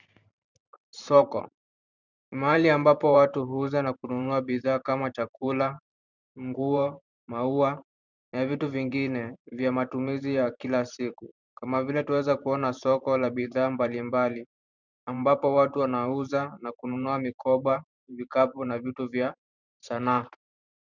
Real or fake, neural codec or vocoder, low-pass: fake; vocoder, 44.1 kHz, 128 mel bands every 512 samples, BigVGAN v2; 7.2 kHz